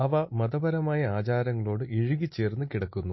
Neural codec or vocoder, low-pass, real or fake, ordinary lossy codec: none; 7.2 kHz; real; MP3, 24 kbps